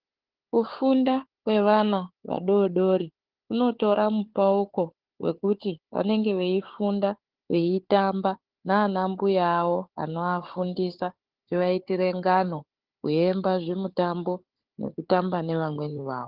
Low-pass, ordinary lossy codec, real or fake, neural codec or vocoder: 5.4 kHz; Opus, 16 kbps; fake; codec, 16 kHz, 4 kbps, FunCodec, trained on Chinese and English, 50 frames a second